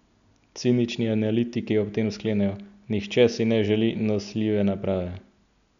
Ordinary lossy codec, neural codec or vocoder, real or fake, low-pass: none; none; real; 7.2 kHz